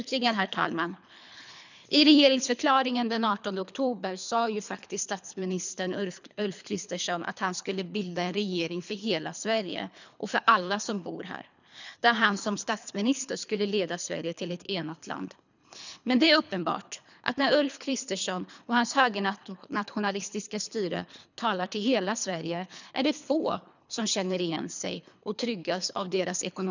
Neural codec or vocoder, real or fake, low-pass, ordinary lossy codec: codec, 24 kHz, 3 kbps, HILCodec; fake; 7.2 kHz; none